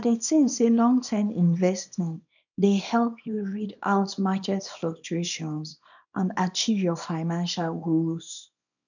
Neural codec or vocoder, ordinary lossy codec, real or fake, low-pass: codec, 24 kHz, 0.9 kbps, WavTokenizer, small release; none; fake; 7.2 kHz